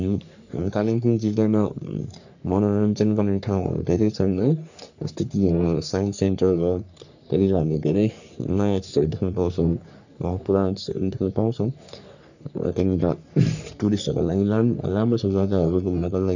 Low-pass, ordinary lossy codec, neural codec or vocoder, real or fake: 7.2 kHz; none; codec, 44.1 kHz, 3.4 kbps, Pupu-Codec; fake